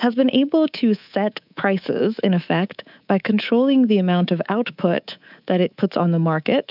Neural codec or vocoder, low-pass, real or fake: codec, 24 kHz, 3.1 kbps, DualCodec; 5.4 kHz; fake